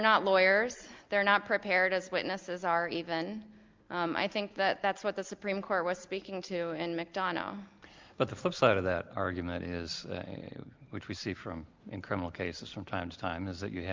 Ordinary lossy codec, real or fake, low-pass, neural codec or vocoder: Opus, 32 kbps; real; 7.2 kHz; none